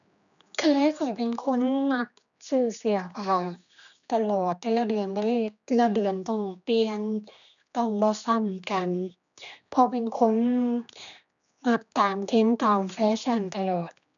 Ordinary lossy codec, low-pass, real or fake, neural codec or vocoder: none; 7.2 kHz; fake; codec, 16 kHz, 2 kbps, X-Codec, HuBERT features, trained on general audio